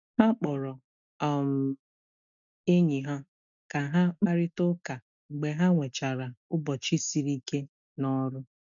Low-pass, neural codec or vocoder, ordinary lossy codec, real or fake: 7.2 kHz; none; none; real